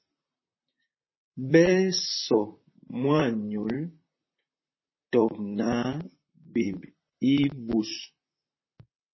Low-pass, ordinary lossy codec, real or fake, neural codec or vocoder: 7.2 kHz; MP3, 24 kbps; fake; vocoder, 44.1 kHz, 128 mel bands, Pupu-Vocoder